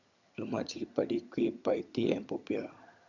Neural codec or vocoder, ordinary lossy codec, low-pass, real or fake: vocoder, 22.05 kHz, 80 mel bands, HiFi-GAN; none; 7.2 kHz; fake